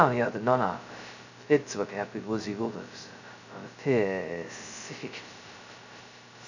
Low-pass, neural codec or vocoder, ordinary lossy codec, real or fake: 7.2 kHz; codec, 16 kHz, 0.2 kbps, FocalCodec; none; fake